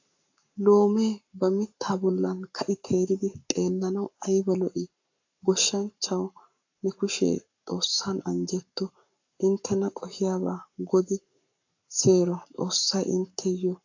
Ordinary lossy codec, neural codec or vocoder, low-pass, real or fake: AAC, 48 kbps; codec, 44.1 kHz, 7.8 kbps, Pupu-Codec; 7.2 kHz; fake